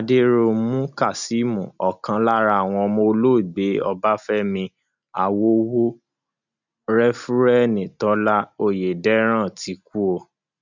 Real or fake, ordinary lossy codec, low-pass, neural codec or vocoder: real; none; 7.2 kHz; none